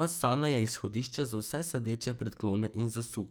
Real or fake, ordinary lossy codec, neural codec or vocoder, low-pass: fake; none; codec, 44.1 kHz, 2.6 kbps, SNAC; none